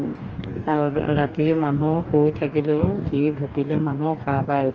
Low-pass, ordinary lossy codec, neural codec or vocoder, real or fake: 7.2 kHz; Opus, 24 kbps; codec, 44.1 kHz, 2.6 kbps, DAC; fake